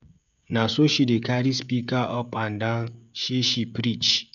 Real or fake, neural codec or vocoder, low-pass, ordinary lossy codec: fake; codec, 16 kHz, 16 kbps, FreqCodec, smaller model; 7.2 kHz; none